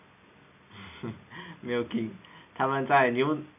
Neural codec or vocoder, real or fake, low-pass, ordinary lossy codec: none; real; 3.6 kHz; none